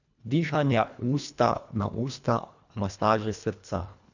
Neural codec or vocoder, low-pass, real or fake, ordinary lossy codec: codec, 24 kHz, 1.5 kbps, HILCodec; 7.2 kHz; fake; none